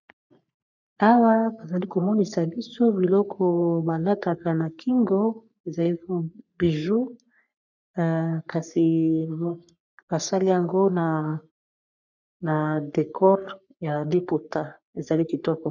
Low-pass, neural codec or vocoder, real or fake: 7.2 kHz; codec, 44.1 kHz, 3.4 kbps, Pupu-Codec; fake